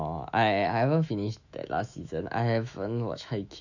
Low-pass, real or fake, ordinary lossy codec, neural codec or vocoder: 7.2 kHz; fake; none; autoencoder, 48 kHz, 128 numbers a frame, DAC-VAE, trained on Japanese speech